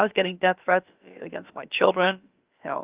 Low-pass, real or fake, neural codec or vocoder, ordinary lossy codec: 3.6 kHz; fake; codec, 16 kHz, about 1 kbps, DyCAST, with the encoder's durations; Opus, 24 kbps